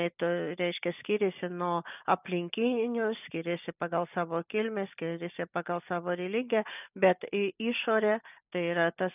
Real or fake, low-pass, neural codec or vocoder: real; 3.6 kHz; none